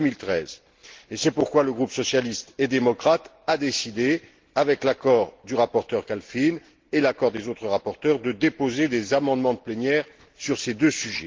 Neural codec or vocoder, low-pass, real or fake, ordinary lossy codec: none; 7.2 kHz; real; Opus, 16 kbps